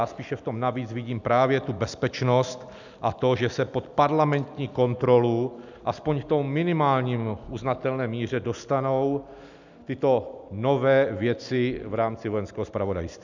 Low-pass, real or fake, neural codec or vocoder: 7.2 kHz; real; none